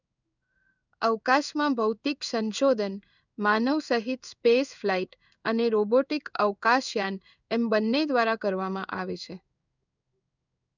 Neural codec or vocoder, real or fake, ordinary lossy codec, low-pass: codec, 16 kHz in and 24 kHz out, 1 kbps, XY-Tokenizer; fake; none; 7.2 kHz